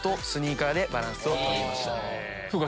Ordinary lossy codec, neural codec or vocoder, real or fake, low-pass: none; none; real; none